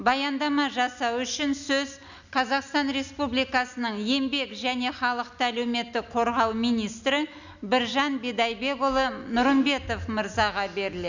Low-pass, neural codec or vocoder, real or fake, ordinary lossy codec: 7.2 kHz; none; real; none